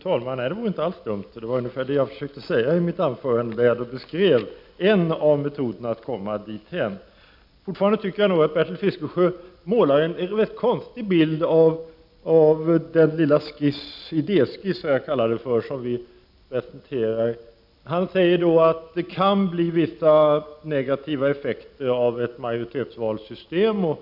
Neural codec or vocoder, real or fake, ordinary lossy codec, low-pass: none; real; none; 5.4 kHz